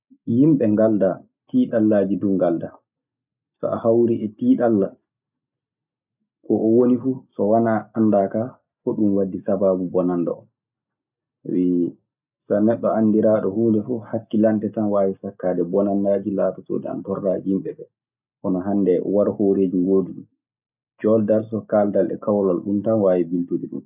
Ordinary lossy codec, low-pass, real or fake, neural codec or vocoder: none; 3.6 kHz; real; none